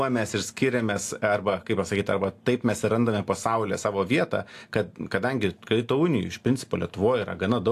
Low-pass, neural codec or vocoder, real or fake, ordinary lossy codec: 14.4 kHz; none; real; AAC, 64 kbps